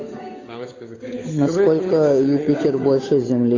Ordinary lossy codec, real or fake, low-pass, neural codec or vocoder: AAC, 32 kbps; fake; 7.2 kHz; codec, 16 kHz, 8 kbps, FunCodec, trained on Chinese and English, 25 frames a second